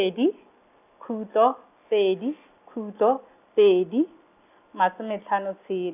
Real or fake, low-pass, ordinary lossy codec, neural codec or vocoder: real; 3.6 kHz; none; none